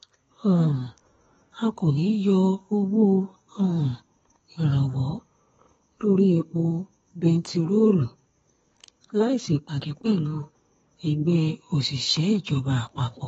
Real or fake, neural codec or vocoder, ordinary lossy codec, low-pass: fake; codec, 32 kHz, 1.9 kbps, SNAC; AAC, 24 kbps; 14.4 kHz